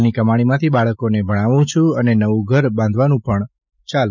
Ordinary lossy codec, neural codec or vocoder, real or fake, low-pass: none; none; real; 7.2 kHz